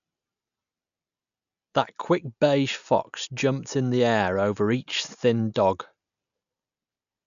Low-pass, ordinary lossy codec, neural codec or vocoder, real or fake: 7.2 kHz; none; none; real